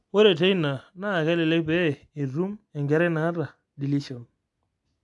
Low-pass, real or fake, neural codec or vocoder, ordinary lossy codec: 10.8 kHz; real; none; AAC, 64 kbps